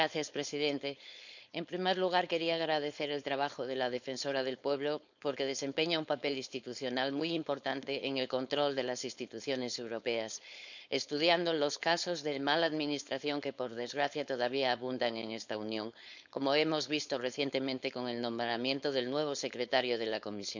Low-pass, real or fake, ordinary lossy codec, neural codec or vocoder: 7.2 kHz; fake; none; codec, 16 kHz, 4.8 kbps, FACodec